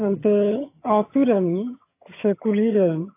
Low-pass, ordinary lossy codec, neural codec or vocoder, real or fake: 3.6 kHz; AAC, 24 kbps; vocoder, 22.05 kHz, 80 mel bands, HiFi-GAN; fake